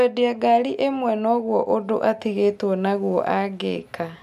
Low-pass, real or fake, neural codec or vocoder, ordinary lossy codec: 14.4 kHz; real; none; none